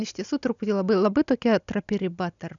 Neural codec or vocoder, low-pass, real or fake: none; 7.2 kHz; real